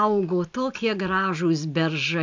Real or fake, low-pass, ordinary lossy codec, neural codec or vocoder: real; 7.2 kHz; MP3, 64 kbps; none